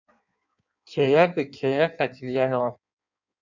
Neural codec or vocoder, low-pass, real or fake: codec, 16 kHz in and 24 kHz out, 1.1 kbps, FireRedTTS-2 codec; 7.2 kHz; fake